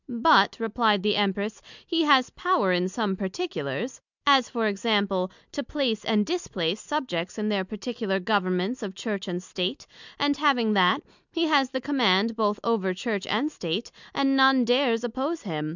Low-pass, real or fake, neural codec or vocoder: 7.2 kHz; real; none